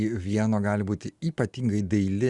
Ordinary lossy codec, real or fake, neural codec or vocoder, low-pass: Opus, 64 kbps; real; none; 10.8 kHz